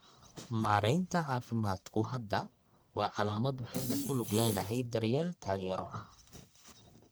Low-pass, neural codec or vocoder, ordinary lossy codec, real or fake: none; codec, 44.1 kHz, 1.7 kbps, Pupu-Codec; none; fake